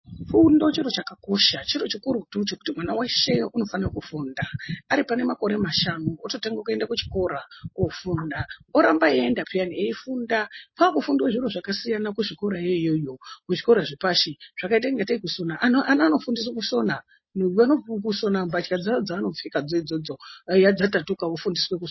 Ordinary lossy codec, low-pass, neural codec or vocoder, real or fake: MP3, 24 kbps; 7.2 kHz; none; real